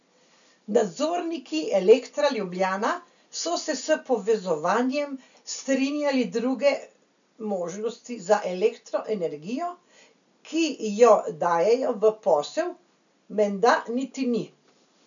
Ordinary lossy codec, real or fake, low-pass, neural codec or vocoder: none; real; 7.2 kHz; none